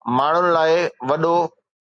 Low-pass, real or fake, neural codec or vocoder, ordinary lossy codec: 9.9 kHz; real; none; MP3, 64 kbps